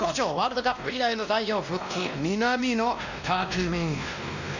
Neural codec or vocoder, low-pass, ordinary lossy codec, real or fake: codec, 16 kHz, 1 kbps, X-Codec, WavLM features, trained on Multilingual LibriSpeech; 7.2 kHz; none; fake